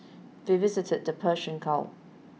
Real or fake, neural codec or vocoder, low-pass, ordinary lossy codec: real; none; none; none